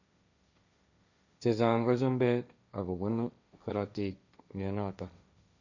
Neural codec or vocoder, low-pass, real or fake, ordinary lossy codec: codec, 16 kHz, 1.1 kbps, Voila-Tokenizer; 7.2 kHz; fake; none